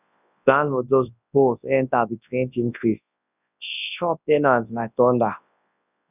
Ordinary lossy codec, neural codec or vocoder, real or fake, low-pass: none; codec, 24 kHz, 0.9 kbps, WavTokenizer, large speech release; fake; 3.6 kHz